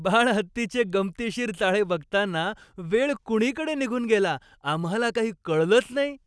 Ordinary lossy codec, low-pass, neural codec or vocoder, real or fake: none; none; none; real